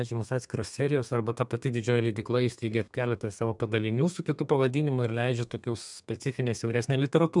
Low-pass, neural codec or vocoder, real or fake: 10.8 kHz; codec, 32 kHz, 1.9 kbps, SNAC; fake